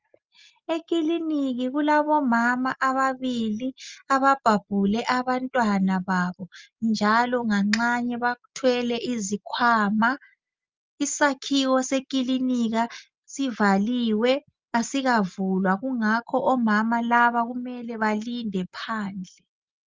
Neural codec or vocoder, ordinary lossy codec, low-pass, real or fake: none; Opus, 32 kbps; 7.2 kHz; real